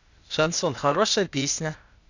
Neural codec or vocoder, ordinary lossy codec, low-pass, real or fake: codec, 16 kHz, 0.8 kbps, ZipCodec; none; 7.2 kHz; fake